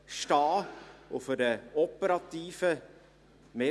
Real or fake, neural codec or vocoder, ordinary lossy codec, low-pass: real; none; none; none